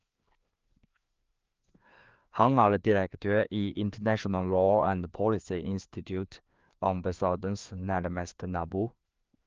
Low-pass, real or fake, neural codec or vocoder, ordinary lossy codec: 7.2 kHz; fake; codec, 16 kHz, 2 kbps, FreqCodec, larger model; Opus, 24 kbps